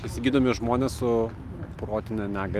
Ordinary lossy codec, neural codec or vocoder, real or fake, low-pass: Opus, 32 kbps; none; real; 14.4 kHz